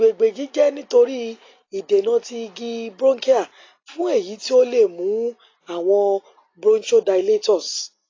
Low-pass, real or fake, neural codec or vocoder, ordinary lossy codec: 7.2 kHz; real; none; AAC, 32 kbps